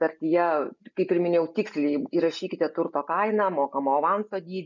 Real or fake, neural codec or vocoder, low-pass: real; none; 7.2 kHz